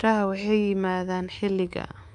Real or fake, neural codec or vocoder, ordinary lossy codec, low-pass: fake; codec, 24 kHz, 3.1 kbps, DualCodec; AAC, 64 kbps; 10.8 kHz